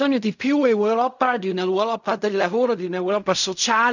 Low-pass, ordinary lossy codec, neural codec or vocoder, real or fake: 7.2 kHz; none; codec, 16 kHz in and 24 kHz out, 0.4 kbps, LongCat-Audio-Codec, fine tuned four codebook decoder; fake